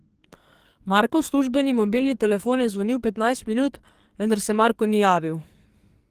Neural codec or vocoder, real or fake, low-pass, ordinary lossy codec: codec, 44.1 kHz, 2.6 kbps, SNAC; fake; 14.4 kHz; Opus, 24 kbps